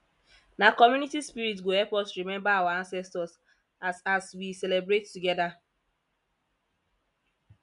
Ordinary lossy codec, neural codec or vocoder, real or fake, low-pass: none; none; real; 10.8 kHz